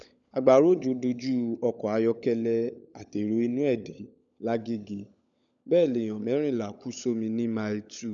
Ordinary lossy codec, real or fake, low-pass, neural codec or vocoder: none; fake; 7.2 kHz; codec, 16 kHz, 16 kbps, FunCodec, trained on LibriTTS, 50 frames a second